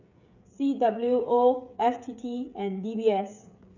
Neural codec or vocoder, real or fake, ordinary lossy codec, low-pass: codec, 16 kHz, 8 kbps, FreqCodec, smaller model; fake; none; 7.2 kHz